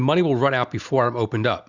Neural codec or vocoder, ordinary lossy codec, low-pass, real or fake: none; Opus, 64 kbps; 7.2 kHz; real